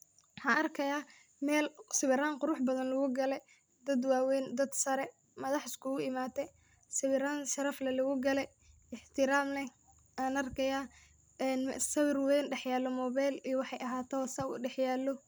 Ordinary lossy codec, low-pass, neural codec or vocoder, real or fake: none; none; none; real